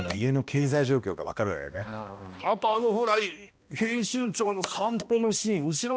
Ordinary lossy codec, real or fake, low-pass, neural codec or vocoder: none; fake; none; codec, 16 kHz, 1 kbps, X-Codec, HuBERT features, trained on balanced general audio